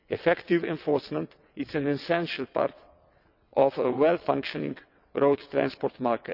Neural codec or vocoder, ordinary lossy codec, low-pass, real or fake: vocoder, 22.05 kHz, 80 mel bands, WaveNeXt; none; 5.4 kHz; fake